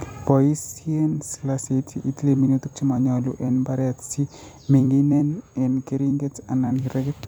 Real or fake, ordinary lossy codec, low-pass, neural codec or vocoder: fake; none; none; vocoder, 44.1 kHz, 128 mel bands every 256 samples, BigVGAN v2